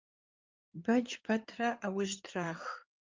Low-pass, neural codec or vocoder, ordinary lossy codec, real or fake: 7.2 kHz; codec, 16 kHz in and 24 kHz out, 2.2 kbps, FireRedTTS-2 codec; Opus, 32 kbps; fake